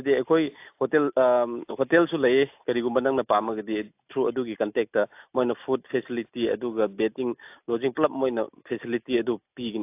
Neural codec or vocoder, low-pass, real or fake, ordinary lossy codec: none; 3.6 kHz; real; AAC, 32 kbps